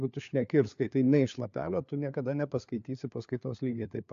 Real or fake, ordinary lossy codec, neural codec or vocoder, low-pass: fake; AAC, 64 kbps; codec, 16 kHz, 4 kbps, FunCodec, trained on LibriTTS, 50 frames a second; 7.2 kHz